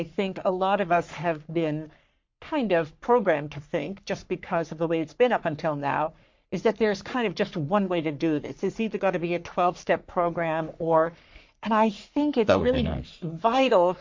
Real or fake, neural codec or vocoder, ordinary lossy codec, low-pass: fake; codec, 44.1 kHz, 3.4 kbps, Pupu-Codec; MP3, 48 kbps; 7.2 kHz